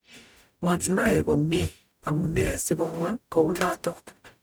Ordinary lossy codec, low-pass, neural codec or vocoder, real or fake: none; none; codec, 44.1 kHz, 0.9 kbps, DAC; fake